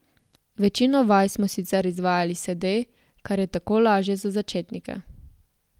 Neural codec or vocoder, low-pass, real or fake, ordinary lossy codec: none; 19.8 kHz; real; Opus, 32 kbps